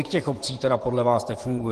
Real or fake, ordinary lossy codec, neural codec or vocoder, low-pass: fake; Opus, 16 kbps; vocoder, 24 kHz, 100 mel bands, Vocos; 10.8 kHz